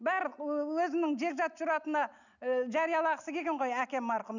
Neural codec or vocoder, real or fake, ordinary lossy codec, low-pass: none; real; none; 7.2 kHz